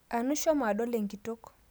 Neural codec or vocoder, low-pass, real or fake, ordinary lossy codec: none; none; real; none